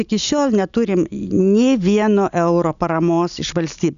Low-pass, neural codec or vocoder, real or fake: 7.2 kHz; none; real